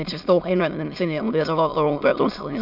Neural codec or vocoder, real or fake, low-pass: autoencoder, 22.05 kHz, a latent of 192 numbers a frame, VITS, trained on many speakers; fake; 5.4 kHz